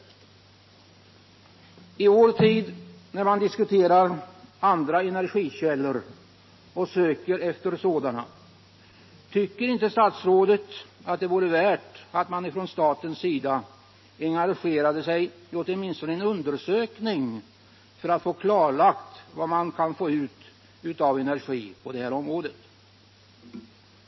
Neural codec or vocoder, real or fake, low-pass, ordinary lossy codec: none; real; 7.2 kHz; MP3, 24 kbps